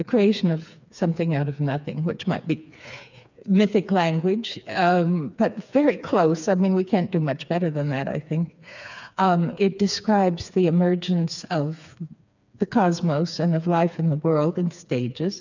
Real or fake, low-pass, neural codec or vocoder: fake; 7.2 kHz; codec, 16 kHz, 4 kbps, FreqCodec, smaller model